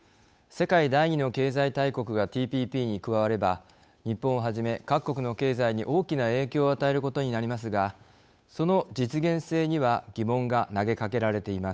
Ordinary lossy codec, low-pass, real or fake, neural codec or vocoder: none; none; fake; codec, 16 kHz, 8 kbps, FunCodec, trained on Chinese and English, 25 frames a second